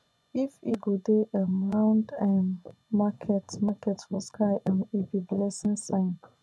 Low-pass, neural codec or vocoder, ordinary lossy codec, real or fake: none; vocoder, 24 kHz, 100 mel bands, Vocos; none; fake